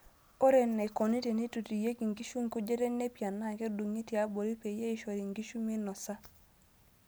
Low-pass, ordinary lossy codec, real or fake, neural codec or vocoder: none; none; real; none